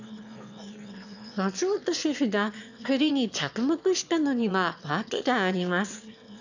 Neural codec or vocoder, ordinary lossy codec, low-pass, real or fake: autoencoder, 22.05 kHz, a latent of 192 numbers a frame, VITS, trained on one speaker; none; 7.2 kHz; fake